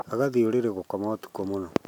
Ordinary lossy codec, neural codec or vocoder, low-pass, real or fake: none; none; 19.8 kHz; real